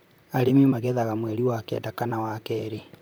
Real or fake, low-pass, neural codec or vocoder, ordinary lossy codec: fake; none; vocoder, 44.1 kHz, 128 mel bands, Pupu-Vocoder; none